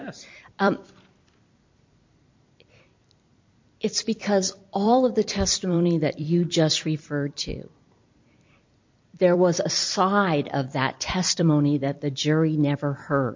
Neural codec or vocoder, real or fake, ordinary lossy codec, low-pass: vocoder, 44.1 kHz, 128 mel bands every 256 samples, BigVGAN v2; fake; MP3, 48 kbps; 7.2 kHz